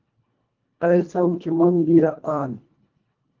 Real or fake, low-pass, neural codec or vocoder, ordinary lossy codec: fake; 7.2 kHz; codec, 24 kHz, 1.5 kbps, HILCodec; Opus, 32 kbps